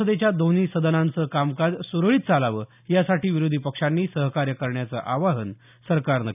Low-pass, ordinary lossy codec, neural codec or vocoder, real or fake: 3.6 kHz; none; none; real